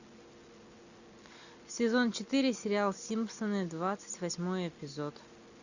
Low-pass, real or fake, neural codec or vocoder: 7.2 kHz; real; none